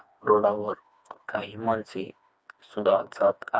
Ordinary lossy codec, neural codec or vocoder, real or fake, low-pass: none; codec, 16 kHz, 2 kbps, FreqCodec, smaller model; fake; none